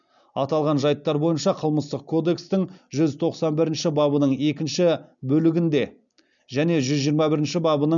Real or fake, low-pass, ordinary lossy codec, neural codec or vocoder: real; 7.2 kHz; none; none